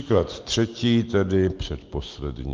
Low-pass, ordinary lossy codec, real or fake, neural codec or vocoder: 7.2 kHz; Opus, 32 kbps; real; none